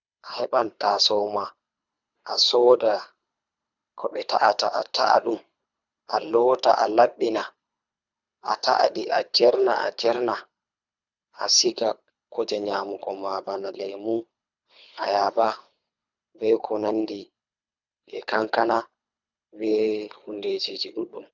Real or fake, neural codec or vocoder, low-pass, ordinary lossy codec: fake; codec, 24 kHz, 3 kbps, HILCodec; 7.2 kHz; none